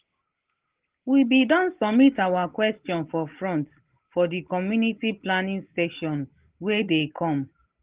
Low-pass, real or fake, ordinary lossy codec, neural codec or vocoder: 3.6 kHz; real; Opus, 16 kbps; none